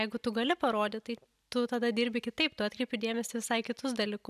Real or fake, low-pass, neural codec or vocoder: real; 14.4 kHz; none